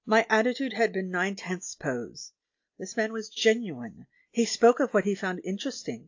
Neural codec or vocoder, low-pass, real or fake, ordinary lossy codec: none; 7.2 kHz; real; AAC, 48 kbps